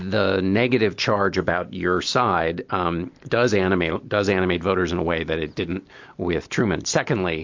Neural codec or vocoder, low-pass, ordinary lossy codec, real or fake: none; 7.2 kHz; MP3, 48 kbps; real